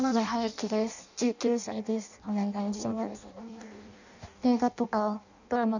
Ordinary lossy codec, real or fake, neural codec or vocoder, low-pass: none; fake; codec, 16 kHz in and 24 kHz out, 0.6 kbps, FireRedTTS-2 codec; 7.2 kHz